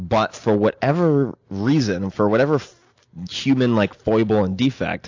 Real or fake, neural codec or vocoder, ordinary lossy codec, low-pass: real; none; AAC, 48 kbps; 7.2 kHz